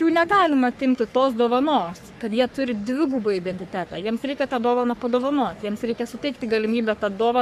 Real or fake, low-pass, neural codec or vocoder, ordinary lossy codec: fake; 14.4 kHz; codec, 44.1 kHz, 3.4 kbps, Pupu-Codec; AAC, 96 kbps